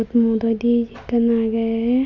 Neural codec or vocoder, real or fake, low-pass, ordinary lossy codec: none; real; 7.2 kHz; MP3, 64 kbps